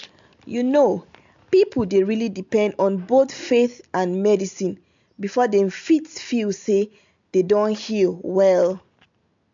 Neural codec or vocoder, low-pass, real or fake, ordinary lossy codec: none; 7.2 kHz; real; MP3, 64 kbps